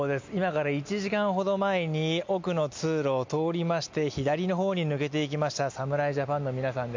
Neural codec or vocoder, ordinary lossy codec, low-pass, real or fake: none; MP3, 64 kbps; 7.2 kHz; real